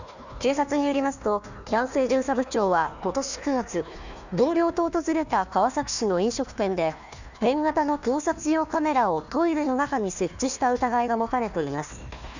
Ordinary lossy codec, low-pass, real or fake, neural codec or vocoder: none; 7.2 kHz; fake; codec, 16 kHz, 1 kbps, FunCodec, trained on Chinese and English, 50 frames a second